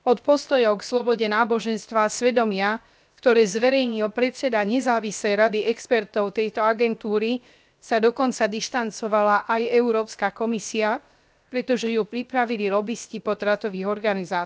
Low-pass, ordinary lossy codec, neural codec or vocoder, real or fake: none; none; codec, 16 kHz, 0.7 kbps, FocalCodec; fake